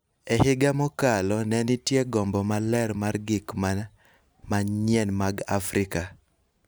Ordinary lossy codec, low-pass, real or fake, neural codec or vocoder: none; none; real; none